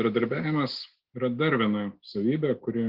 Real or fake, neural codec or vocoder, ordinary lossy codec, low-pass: real; none; Opus, 16 kbps; 5.4 kHz